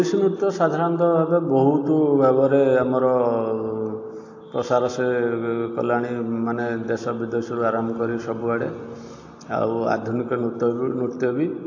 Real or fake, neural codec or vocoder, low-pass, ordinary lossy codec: real; none; 7.2 kHz; AAC, 48 kbps